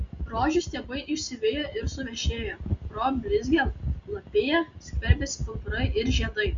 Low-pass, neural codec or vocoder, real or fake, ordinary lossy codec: 7.2 kHz; none; real; Opus, 64 kbps